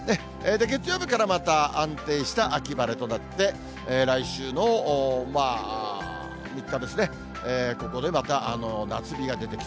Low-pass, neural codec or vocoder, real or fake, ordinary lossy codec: none; none; real; none